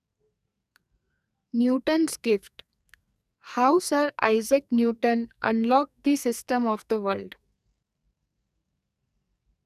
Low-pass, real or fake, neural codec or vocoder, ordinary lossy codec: 14.4 kHz; fake; codec, 44.1 kHz, 2.6 kbps, SNAC; none